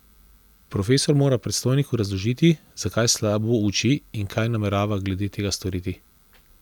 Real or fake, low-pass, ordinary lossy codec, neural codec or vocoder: real; 19.8 kHz; none; none